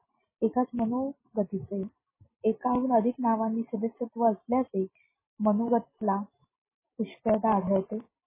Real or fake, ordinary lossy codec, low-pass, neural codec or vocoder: real; MP3, 16 kbps; 3.6 kHz; none